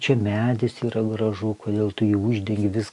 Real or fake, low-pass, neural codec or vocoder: real; 10.8 kHz; none